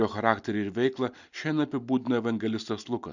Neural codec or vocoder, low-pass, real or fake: none; 7.2 kHz; real